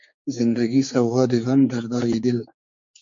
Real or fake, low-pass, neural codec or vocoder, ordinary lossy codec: fake; 7.2 kHz; codec, 16 kHz, 4 kbps, X-Codec, HuBERT features, trained on general audio; MP3, 48 kbps